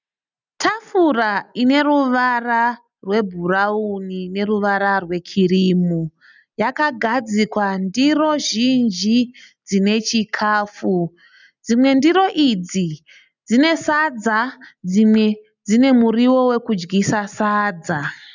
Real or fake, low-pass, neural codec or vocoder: real; 7.2 kHz; none